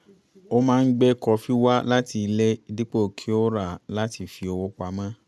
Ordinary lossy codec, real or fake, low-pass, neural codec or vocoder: none; real; none; none